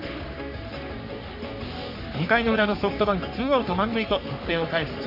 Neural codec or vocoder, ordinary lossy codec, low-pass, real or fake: codec, 44.1 kHz, 3.4 kbps, Pupu-Codec; none; 5.4 kHz; fake